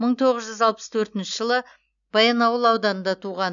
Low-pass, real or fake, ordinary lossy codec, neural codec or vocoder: 7.2 kHz; real; none; none